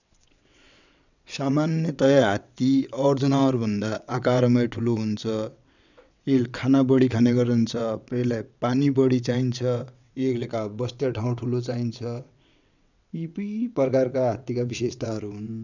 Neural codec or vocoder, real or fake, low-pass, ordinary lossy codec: vocoder, 22.05 kHz, 80 mel bands, WaveNeXt; fake; 7.2 kHz; none